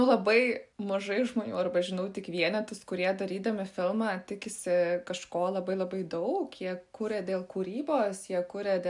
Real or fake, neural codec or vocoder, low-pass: real; none; 10.8 kHz